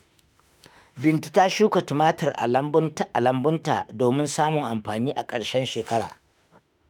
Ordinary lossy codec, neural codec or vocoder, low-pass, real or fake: none; autoencoder, 48 kHz, 32 numbers a frame, DAC-VAE, trained on Japanese speech; none; fake